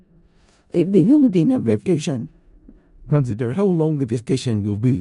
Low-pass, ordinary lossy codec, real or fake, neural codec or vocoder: 10.8 kHz; none; fake; codec, 16 kHz in and 24 kHz out, 0.4 kbps, LongCat-Audio-Codec, four codebook decoder